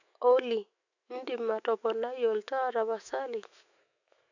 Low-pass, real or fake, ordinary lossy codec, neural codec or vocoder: 7.2 kHz; fake; none; autoencoder, 48 kHz, 128 numbers a frame, DAC-VAE, trained on Japanese speech